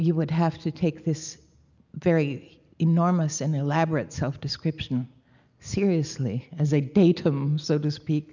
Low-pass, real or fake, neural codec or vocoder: 7.2 kHz; real; none